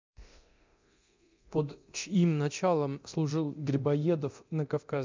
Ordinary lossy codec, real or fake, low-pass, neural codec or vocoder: MP3, 64 kbps; fake; 7.2 kHz; codec, 24 kHz, 0.9 kbps, DualCodec